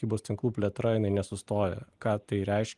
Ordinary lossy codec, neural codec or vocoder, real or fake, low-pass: Opus, 24 kbps; none; real; 10.8 kHz